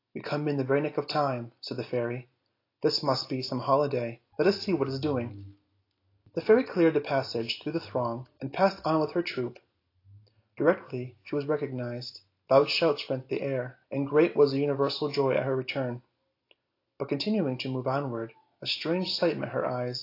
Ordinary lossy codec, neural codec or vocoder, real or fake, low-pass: AAC, 32 kbps; none; real; 5.4 kHz